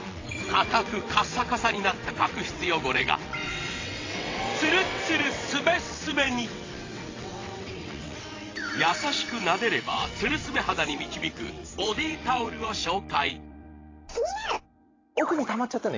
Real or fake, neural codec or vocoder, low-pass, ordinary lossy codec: fake; vocoder, 22.05 kHz, 80 mel bands, WaveNeXt; 7.2 kHz; AAC, 32 kbps